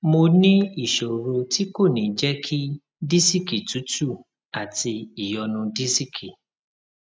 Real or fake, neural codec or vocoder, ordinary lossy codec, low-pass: real; none; none; none